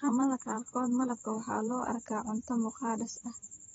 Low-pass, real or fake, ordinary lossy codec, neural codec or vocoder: 19.8 kHz; fake; AAC, 24 kbps; vocoder, 44.1 kHz, 128 mel bands every 512 samples, BigVGAN v2